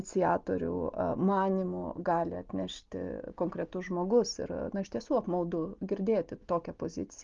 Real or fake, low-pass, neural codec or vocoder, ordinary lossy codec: real; 7.2 kHz; none; Opus, 32 kbps